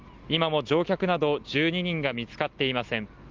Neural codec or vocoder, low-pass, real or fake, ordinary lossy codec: none; 7.2 kHz; real; Opus, 32 kbps